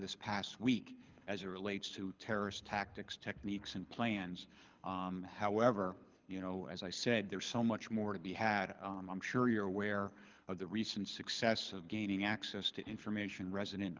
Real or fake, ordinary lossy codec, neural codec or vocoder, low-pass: fake; Opus, 32 kbps; codec, 24 kHz, 6 kbps, HILCodec; 7.2 kHz